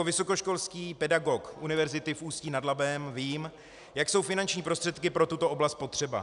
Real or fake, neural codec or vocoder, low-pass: real; none; 10.8 kHz